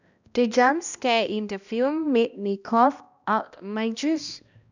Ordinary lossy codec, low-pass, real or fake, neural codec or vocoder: none; 7.2 kHz; fake; codec, 16 kHz, 1 kbps, X-Codec, HuBERT features, trained on balanced general audio